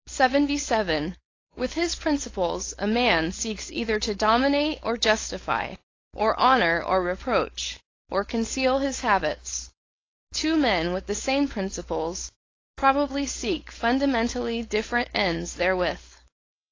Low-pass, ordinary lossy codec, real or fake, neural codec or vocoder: 7.2 kHz; AAC, 32 kbps; fake; codec, 16 kHz, 4.8 kbps, FACodec